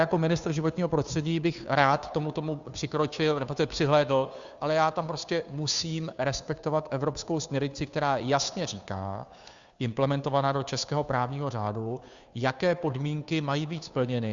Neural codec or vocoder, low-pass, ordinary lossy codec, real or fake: codec, 16 kHz, 2 kbps, FunCodec, trained on Chinese and English, 25 frames a second; 7.2 kHz; Opus, 64 kbps; fake